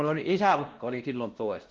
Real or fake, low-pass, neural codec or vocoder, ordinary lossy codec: fake; 7.2 kHz; codec, 16 kHz, 1 kbps, X-Codec, WavLM features, trained on Multilingual LibriSpeech; Opus, 16 kbps